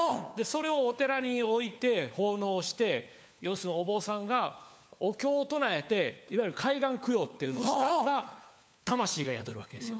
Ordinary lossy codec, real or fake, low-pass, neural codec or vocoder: none; fake; none; codec, 16 kHz, 4 kbps, FunCodec, trained on LibriTTS, 50 frames a second